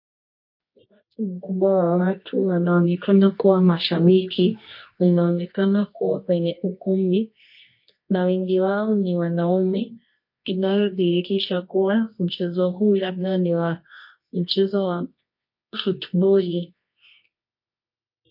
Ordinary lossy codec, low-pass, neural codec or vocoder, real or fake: MP3, 32 kbps; 5.4 kHz; codec, 24 kHz, 0.9 kbps, WavTokenizer, medium music audio release; fake